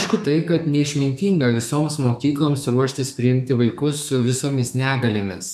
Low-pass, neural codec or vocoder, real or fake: 14.4 kHz; codec, 32 kHz, 1.9 kbps, SNAC; fake